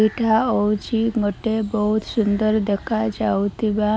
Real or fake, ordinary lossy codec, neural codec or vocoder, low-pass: real; none; none; none